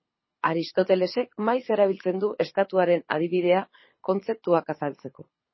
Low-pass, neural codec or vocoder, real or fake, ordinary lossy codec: 7.2 kHz; codec, 24 kHz, 6 kbps, HILCodec; fake; MP3, 24 kbps